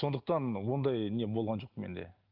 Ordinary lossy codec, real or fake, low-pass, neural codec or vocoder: Opus, 32 kbps; real; 5.4 kHz; none